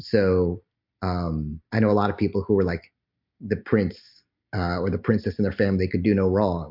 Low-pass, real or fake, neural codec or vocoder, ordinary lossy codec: 5.4 kHz; real; none; MP3, 48 kbps